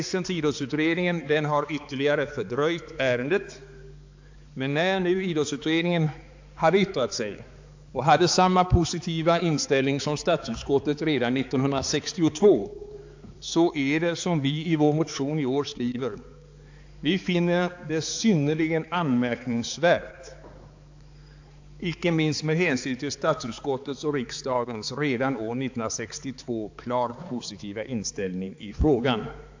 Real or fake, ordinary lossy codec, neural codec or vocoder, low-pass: fake; AAC, 48 kbps; codec, 16 kHz, 4 kbps, X-Codec, HuBERT features, trained on balanced general audio; 7.2 kHz